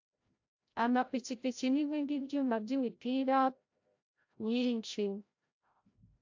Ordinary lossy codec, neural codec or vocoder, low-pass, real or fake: AAC, 48 kbps; codec, 16 kHz, 0.5 kbps, FreqCodec, larger model; 7.2 kHz; fake